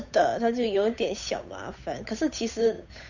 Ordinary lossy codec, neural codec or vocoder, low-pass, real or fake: none; codec, 16 kHz, 8 kbps, FunCodec, trained on Chinese and English, 25 frames a second; 7.2 kHz; fake